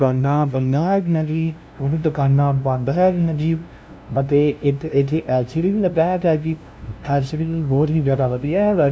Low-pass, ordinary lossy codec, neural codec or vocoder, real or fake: none; none; codec, 16 kHz, 0.5 kbps, FunCodec, trained on LibriTTS, 25 frames a second; fake